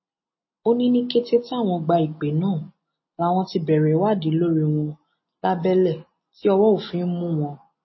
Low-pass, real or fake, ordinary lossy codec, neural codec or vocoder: 7.2 kHz; real; MP3, 24 kbps; none